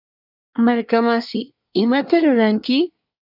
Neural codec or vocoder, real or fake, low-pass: codec, 24 kHz, 1 kbps, SNAC; fake; 5.4 kHz